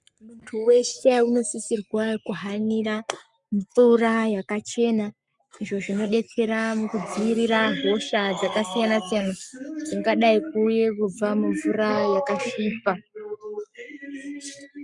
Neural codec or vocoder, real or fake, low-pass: codec, 44.1 kHz, 7.8 kbps, Pupu-Codec; fake; 10.8 kHz